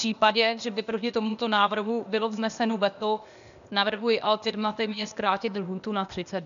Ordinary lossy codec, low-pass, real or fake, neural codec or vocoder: MP3, 96 kbps; 7.2 kHz; fake; codec, 16 kHz, 0.8 kbps, ZipCodec